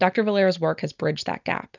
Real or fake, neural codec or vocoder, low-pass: real; none; 7.2 kHz